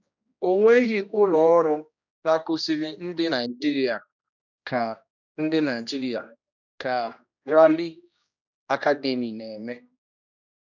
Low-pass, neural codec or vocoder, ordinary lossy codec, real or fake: 7.2 kHz; codec, 16 kHz, 1 kbps, X-Codec, HuBERT features, trained on general audio; none; fake